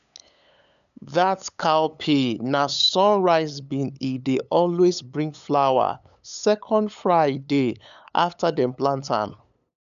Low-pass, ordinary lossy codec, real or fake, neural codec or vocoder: 7.2 kHz; none; fake; codec, 16 kHz, 8 kbps, FunCodec, trained on LibriTTS, 25 frames a second